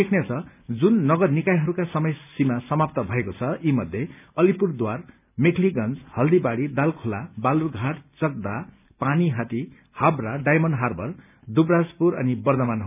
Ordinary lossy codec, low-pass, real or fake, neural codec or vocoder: none; 3.6 kHz; real; none